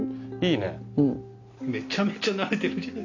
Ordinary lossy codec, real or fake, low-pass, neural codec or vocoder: AAC, 48 kbps; real; 7.2 kHz; none